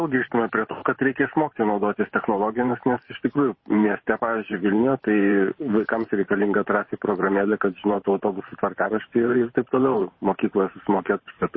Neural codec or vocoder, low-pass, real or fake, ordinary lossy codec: vocoder, 44.1 kHz, 128 mel bands every 512 samples, BigVGAN v2; 7.2 kHz; fake; MP3, 24 kbps